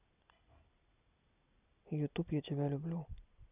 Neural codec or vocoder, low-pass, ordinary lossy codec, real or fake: none; 3.6 kHz; none; real